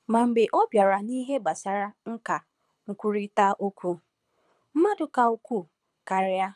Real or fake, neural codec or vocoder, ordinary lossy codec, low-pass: fake; codec, 24 kHz, 6 kbps, HILCodec; none; none